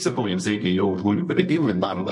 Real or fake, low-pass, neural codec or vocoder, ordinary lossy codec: fake; 10.8 kHz; codec, 24 kHz, 0.9 kbps, WavTokenizer, medium music audio release; MP3, 64 kbps